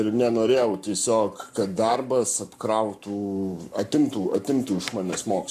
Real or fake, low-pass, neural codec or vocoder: fake; 14.4 kHz; codec, 44.1 kHz, 7.8 kbps, Pupu-Codec